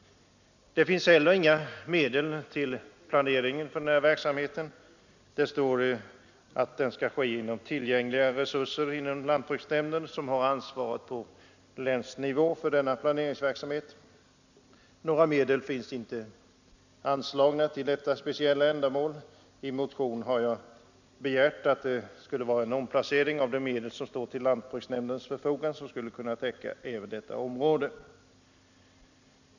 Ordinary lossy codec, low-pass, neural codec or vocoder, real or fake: none; 7.2 kHz; none; real